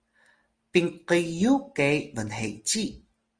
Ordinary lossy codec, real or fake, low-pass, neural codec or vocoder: Opus, 24 kbps; real; 9.9 kHz; none